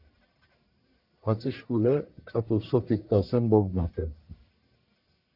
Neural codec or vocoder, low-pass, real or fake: codec, 44.1 kHz, 1.7 kbps, Pupu-Codec; 5.4 kHz; fake